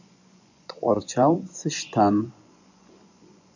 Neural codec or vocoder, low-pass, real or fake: vocoder, 24 kHz, 100 mel bands, Vocos; 7.2 kHz; fake